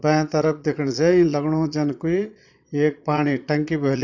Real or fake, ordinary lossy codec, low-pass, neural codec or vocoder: fake; none; 7.2 kHz; vocoder, 22.05 kHz, 80 mel bands, Vocos